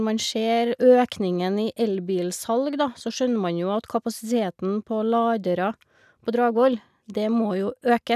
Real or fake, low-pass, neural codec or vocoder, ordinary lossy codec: real; 14.4 kHz; none; none